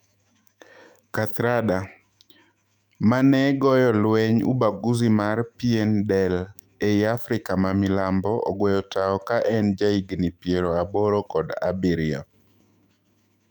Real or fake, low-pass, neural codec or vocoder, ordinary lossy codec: fake; 19.8 kHz; autoencoder, 48 kHz, 128 numbers a frame, DAC-VAE, trained on Japanese speech; none